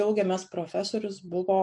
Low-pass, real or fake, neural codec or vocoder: 10.8 kHz; real; none